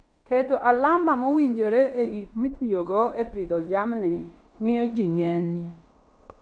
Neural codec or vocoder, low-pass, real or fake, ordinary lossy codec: codec, 16 kHz in and 24 kHz out, 0.9 kbps, LongCat-Audio-Codec, fine tuned four codebook decoder; 9.9 kHz; fake; none